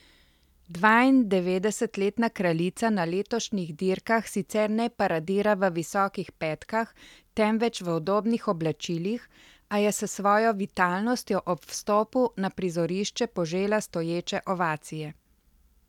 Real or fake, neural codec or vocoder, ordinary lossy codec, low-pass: real; none; none; 19.8 kHz